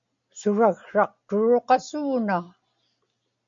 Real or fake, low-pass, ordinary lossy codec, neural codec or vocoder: real; 7.2 kHz; MP3, 48 kbps; none